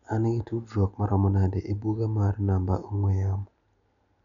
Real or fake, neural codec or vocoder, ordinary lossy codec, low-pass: real; none; none; 7.2 kHz